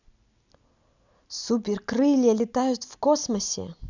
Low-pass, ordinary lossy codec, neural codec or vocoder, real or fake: 7.2 kHz; none; none; real